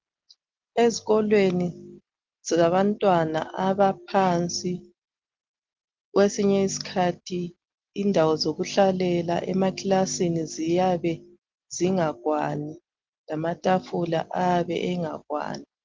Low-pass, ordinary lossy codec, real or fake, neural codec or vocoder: 7.2 kHz; Opus, 16 kbps; real; none